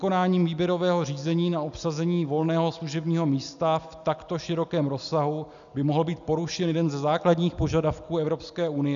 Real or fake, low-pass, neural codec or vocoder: real; 7.2 kHz; none